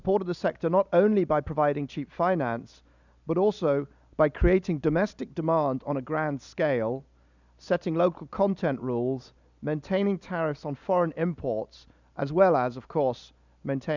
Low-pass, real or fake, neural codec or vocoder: 7.2 kHz; real; none